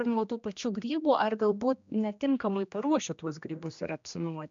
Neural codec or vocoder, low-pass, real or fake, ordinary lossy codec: codec, 16 kHz, 1 kbps, X-Codec, HuBERT features, trained on general audio; 7.2 kHz; fake; MP3, 64 kbps